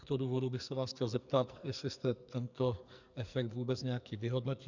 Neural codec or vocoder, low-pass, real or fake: codec, 44.1 kHz, 2.6 kbps, SNAC; 7.2 kHz; fake